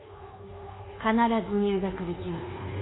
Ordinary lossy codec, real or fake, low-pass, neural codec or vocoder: AAC, 16 kbps; fake; 7.2 kHz; codec, 24 kHz, 1.2 kbps, DualCodec